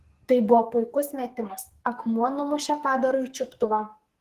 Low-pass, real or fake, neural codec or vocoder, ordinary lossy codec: 14.4 kHz; fake; codec, 44.1 kHz, 2.6 kbps, SNAC; Opus, 16 kbps